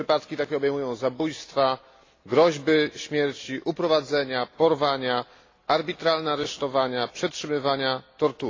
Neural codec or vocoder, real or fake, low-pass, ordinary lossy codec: none; real; 7.2 kHz; AAC, 32 kbps